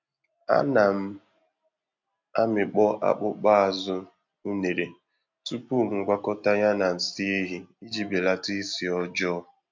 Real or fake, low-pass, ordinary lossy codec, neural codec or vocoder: real; 7.2 kHz; MP3, 64 kbps; none